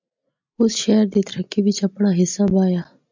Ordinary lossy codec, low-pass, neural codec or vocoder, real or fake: MP3, 64 kbps; 7.2 kHz; none; real